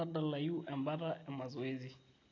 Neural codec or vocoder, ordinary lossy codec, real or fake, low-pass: none; AAC, 32 kbps; real; 7.2 kHz